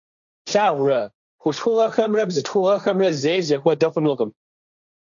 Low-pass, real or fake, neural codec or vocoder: 7.2 kHz; fake; codec, 16 kHz, 1.1 kbps, Voila-Tokenizer